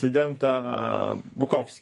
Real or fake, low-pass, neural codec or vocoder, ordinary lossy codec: fake; 14.4 kHz; codec, 44.1 kHz, 2.6 kbps, SNAC; MP3, 48 kbps